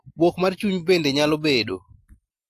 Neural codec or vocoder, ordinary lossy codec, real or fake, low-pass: none; AAC, 64 kbps; real; 14.4 kHz